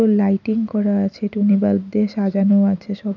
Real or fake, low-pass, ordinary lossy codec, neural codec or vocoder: real; 7.2 kHz; none; none